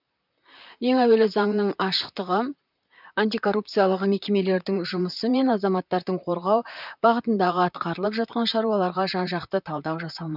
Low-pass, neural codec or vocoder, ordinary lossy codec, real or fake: 5.4 kHz; vocoder, 44.1 kHz, 128 mel bands, Pupu-Vocoder; none; fake